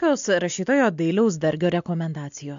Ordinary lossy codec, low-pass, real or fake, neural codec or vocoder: AAC, 64 kbps; 7.2 kHz; real; none